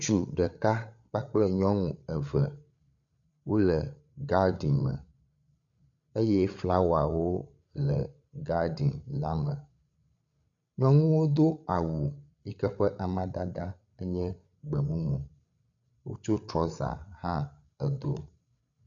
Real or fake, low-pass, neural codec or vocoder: fake; 7.2 kHz; codec, 16 kHz, 8 kbps, FreqCodec, larger model